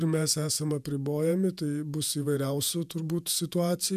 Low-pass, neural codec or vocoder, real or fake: 14.4 kHz; vocoder, 48 kHz, 128 mel bands, Vocos; fake